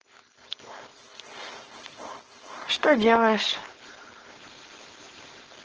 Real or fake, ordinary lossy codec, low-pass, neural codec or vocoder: fake; Opus, 16 kbps; 7.2 kHz; codec, 16 kHz, 4.8 kbps, FACodec